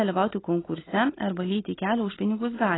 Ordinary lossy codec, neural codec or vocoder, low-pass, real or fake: AAC, 16 kbps; none; 7.2 kHz; real